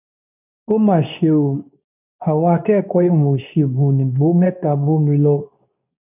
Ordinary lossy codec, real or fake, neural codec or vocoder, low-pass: none; fake; codec, 24 kHz, 0.9 kbps, WavTokenizer, medium speech release version 2; 3.6 kHz